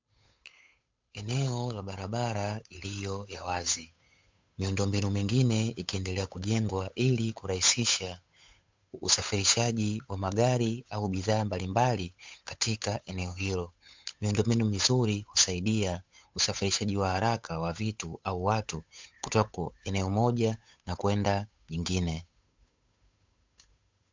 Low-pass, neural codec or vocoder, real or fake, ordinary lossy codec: 7.2 kHz; codec, 16 kHz, 8 kbps, FunCodec, trained on Chinese and English, 25 frames a second; fake; MP3, 64 kbps